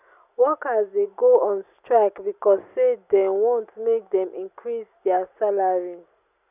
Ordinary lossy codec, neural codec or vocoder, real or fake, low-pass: none; none; real; 3.6 kHz